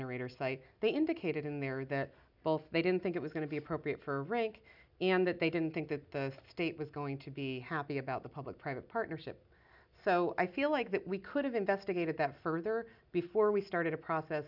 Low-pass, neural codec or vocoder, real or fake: 5.4 kHz; none; real